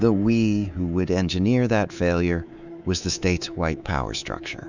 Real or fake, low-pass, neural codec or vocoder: fake; 7.2 kHz; codec, 24 kHz, 3.1 kbps, DualCodec